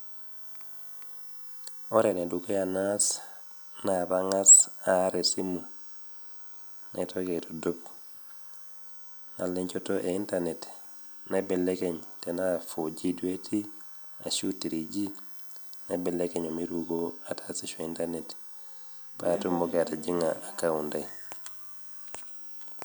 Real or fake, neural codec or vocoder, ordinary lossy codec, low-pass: real; none; none; none